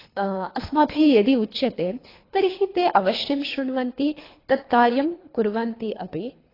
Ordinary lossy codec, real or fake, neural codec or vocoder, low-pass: AAC, 24 kbps; fake; codec, 24 kHz, 3 kbps, HILCodec; 5.4 kHz